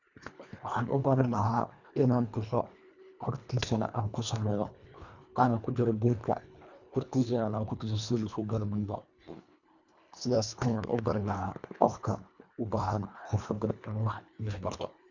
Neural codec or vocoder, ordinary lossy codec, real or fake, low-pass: codec, 24 kHz, 1.5 kbps, HILCodec; none; fake; 7.2 kHz